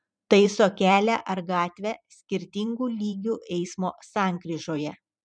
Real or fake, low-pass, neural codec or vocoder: fake; 9.9 kHz; vocoder, 44.1 kHz, 128 mel bands every 512 samples, BigVGAN v2